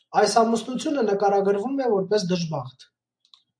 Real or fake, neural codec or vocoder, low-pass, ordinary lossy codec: real; none; 9.9 kHz; AAC, 64 kbps